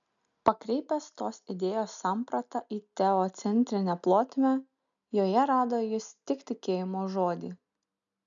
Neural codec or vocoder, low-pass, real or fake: none; 7.2 kHz; real